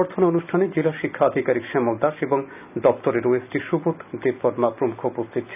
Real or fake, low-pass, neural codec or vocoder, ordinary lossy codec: real; 3.6 kHz; none; none